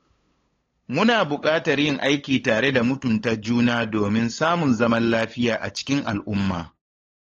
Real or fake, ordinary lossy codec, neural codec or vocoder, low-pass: fake; AAC, 32 kbps; codec, 16 kHz, 8 kbps, FunCodec, trained on LibriTTS, 25 frames a second; 7.2 kHz